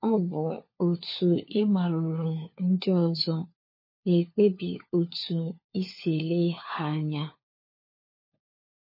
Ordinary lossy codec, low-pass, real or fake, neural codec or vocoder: MP3, 24 kbps; 5.4 kHz; fake; codec, 16 kHz, 4 kbps, FunCodec, trained on LibriTTS, 50 frames a second